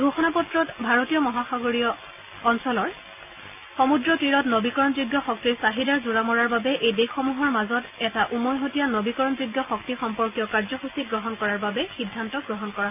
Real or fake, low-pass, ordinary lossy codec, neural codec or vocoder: real; 3.6 kHz; none; none